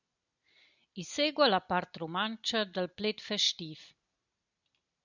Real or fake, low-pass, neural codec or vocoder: real; 7.2 kHz; none